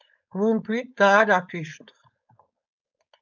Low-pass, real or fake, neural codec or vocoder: 7.2 kHz; fake; codec, 16 kHz, 4.8 kbps, FACodec